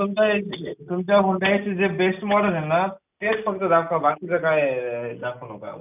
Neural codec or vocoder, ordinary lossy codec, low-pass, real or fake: none; none; 3.6 kHz; real